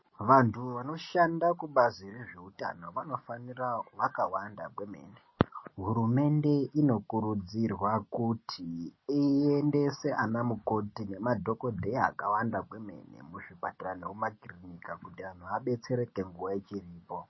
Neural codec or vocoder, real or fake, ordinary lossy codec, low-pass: none; real; MP3, 24 kbps; 7.2 kHz